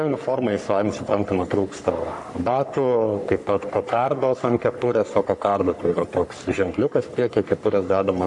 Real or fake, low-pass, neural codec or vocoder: fake; 10.8 kHz; codec, 44.1 kHz, 3.4 kbps, Pupu-Codec